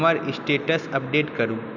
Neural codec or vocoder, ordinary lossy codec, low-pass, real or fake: none; none; 7.2 kHz; real